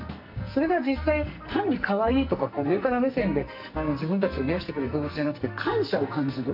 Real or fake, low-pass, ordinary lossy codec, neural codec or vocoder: fake; 5.4 kHz; none; codec, 44.1 kHz, 2.6 kbps, SNAC